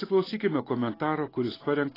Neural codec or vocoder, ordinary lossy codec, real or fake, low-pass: none; AAC, 24 kbps; real; 5.4 kHz